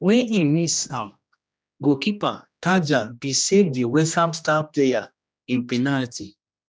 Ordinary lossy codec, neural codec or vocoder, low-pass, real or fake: none; codec, 16 kHz, 1 kbps, X-Codec, HuBERT features, trained on general audio; none; fake